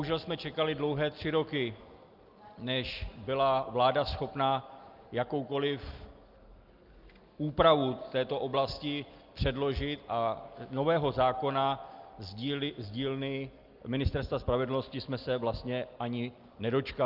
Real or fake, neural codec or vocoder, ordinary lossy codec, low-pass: real; none; Opus, 24 kbps; 5.4 kHz